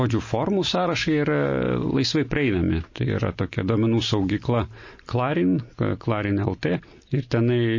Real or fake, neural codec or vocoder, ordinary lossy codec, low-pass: real; none; MP3, 32 kbps; 7.2 kHz